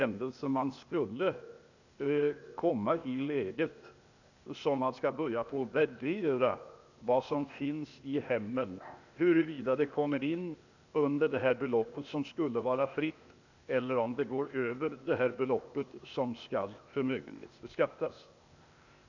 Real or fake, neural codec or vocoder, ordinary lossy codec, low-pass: fake; codec, 16 kHz, 0.8 kbps, ZipCodec; none; 7.2 kHz